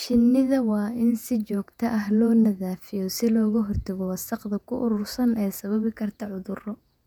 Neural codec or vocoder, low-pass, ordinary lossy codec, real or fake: vocoder, 48 kHz, 128 mel bands, Vocos; 19.8 kHz; none; fake